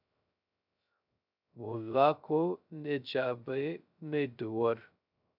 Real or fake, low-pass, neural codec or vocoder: fake; 5.4 kHz; codec, 16 kHz, 0.2 kbps, FocalCodec